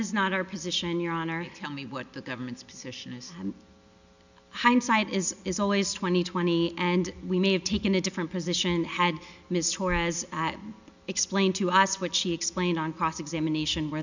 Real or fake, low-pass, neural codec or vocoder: real; 7.2 kHz; none